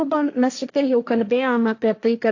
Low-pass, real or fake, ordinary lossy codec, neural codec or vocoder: 7.2 kHz; fake; AAC, 48 kbps; codec, 16 kHz, 1.1 kbps, Voila-Tokenizer